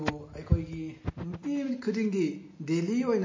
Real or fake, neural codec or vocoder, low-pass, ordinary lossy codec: real; none; 7.2 kHz; MP3, 32 kbps